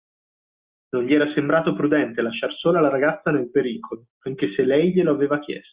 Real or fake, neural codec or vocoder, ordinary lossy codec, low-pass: real; none; Opus, 64 kbps; 3.6 kHz